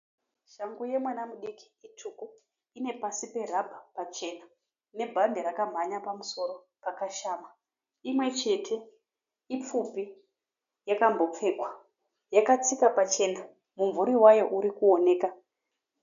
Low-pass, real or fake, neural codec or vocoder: 7.2 kHz; real; none